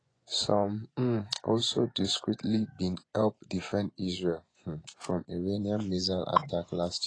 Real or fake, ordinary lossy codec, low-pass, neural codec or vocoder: fake; AAC, 32 kbps; 9.9 kHz; vocoder, 24 kHz, 100 mel bands, Vocos